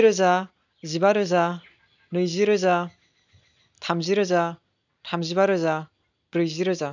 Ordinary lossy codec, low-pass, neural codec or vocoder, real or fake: none; 7.2 kHz; none; real